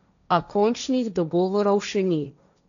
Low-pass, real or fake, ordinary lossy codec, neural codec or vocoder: 7.2 kHz; fake; none; codec, 16 kHz, 1.1 kbps, Voila-Tokenizer